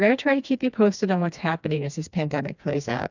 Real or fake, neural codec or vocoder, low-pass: fake; codec, 16 kHz, 2 kbps, FreqCodec, smaller model; 7.2 kHz